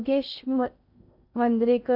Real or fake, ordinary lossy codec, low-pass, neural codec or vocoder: fake; none; 5.4 kHz; codec, 16 kHz in and 24 kHz out, 0.6 kbps, FocalCodec, streaming, 2048 codes